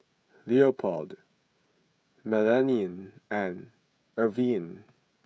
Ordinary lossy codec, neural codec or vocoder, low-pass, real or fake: none; codec, 16 kHz, 16 kbps, FreqCodec, smaller model; none; fake